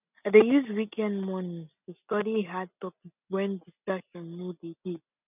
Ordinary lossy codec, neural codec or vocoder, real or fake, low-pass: none; none; real; 3.6 kHz